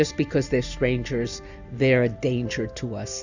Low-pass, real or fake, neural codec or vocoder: 7.2 kHz; real; none